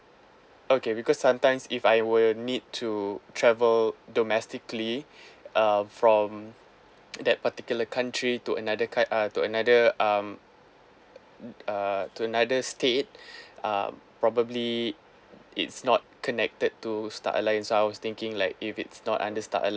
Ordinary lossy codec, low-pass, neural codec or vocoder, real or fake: none; none; none; real